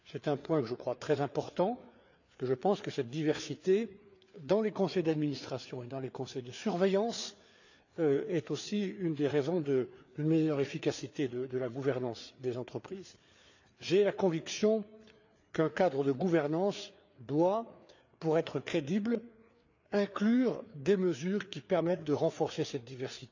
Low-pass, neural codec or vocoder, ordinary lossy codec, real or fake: 7.2 kHz; codec, 16 kHz, 4 kbps, FreqCodec, larger model; none; fake